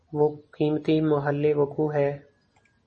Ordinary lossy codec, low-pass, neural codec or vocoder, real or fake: MP3, 32 kbps; 10.8 kHz; none; real